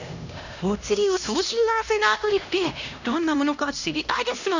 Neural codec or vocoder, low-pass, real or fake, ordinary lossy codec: codec, 16 kHz, 1 kbps, X-Codec, HuBERT features, trained on LibriSpeech; 7.2 kHz; fake; none